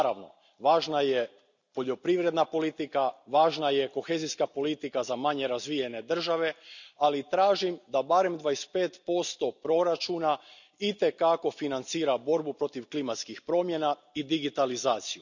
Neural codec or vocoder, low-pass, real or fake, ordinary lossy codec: none; 7.2 kHz; real; none